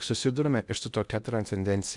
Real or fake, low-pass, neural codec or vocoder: fake; 10.8 kHz; codec, 16 kHz in and 24 kHz out, 0.8 kbps, FocalCodec, streaming, 65536 codes